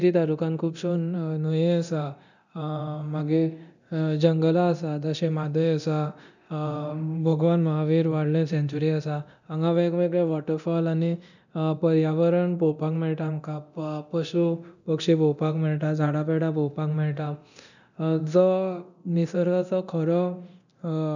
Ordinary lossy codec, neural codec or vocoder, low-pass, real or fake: none; codec, 24 kHz, 0.9 kbps, DualCodec; 7.2 kHz; fake